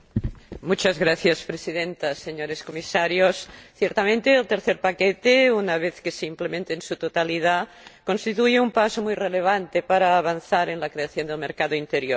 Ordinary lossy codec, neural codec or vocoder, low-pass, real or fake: none; none; none; real